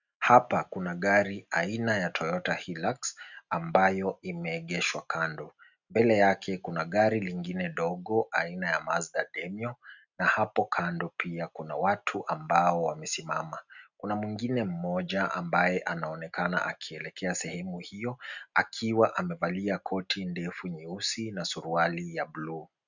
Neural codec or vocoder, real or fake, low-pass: none; real; 7.2 kHz